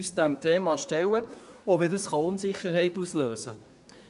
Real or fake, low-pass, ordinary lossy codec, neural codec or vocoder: fake; 10.8 kHz; none; codec, 24 kHz, 1 kbps, SNAC